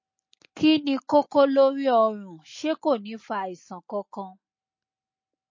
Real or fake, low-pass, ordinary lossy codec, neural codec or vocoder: real; 7.2 kHz; MP3, 32 kbps; none